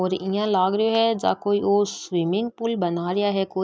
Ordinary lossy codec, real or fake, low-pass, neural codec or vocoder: none; real; none; none